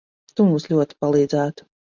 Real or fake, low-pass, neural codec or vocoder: real; 7.2 kHz; none